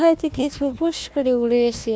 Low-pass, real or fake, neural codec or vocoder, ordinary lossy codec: none; fake; codec, 16 kHz, 1 kbps, FunCodec, trained on Chinese and English, 50 frames a second; none